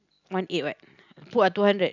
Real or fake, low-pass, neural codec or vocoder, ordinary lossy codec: real; 7.2 kHz; none; none